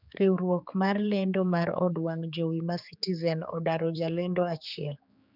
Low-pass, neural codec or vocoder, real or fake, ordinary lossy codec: 5.4 kHz; codec, 16 kHz, 4 kbps, X-Codec, HuBERT features, trained on general audio; fake; none